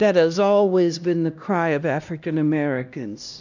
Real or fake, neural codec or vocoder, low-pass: fake; codec, 16 kHz, 1 kbps, X-Codec, HuBERT features, trained on LibriSpeech; 7.2 kHz